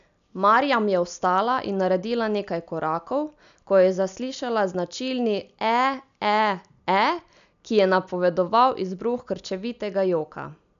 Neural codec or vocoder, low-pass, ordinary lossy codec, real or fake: none; 7.2 kHz; none; real